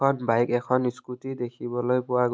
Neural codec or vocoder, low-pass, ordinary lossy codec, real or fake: none; none; none; real